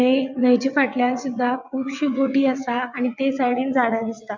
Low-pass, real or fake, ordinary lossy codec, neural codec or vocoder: 7.2 kHz; fake; MP3, 64 kbps; vocoder, 22.05 kHz, 80 mel bands, WaveNeXt